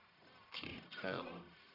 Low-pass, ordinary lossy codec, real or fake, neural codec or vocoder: 5.4 kHz; none; fake; codec, 44.1 kHz, 1.7 kbps, Pupu-Codec